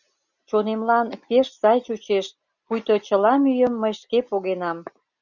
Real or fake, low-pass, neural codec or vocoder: real; 7.2 kHz; none